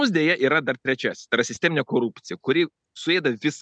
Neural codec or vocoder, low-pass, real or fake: none; 9.9 kHz; real